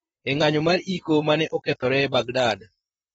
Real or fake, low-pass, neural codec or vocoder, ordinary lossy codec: fake; 19.8 kHz; vocoder, 44.1 kHz, 128 mel bands, Pupu-Vocoder; AAC, 24 kbps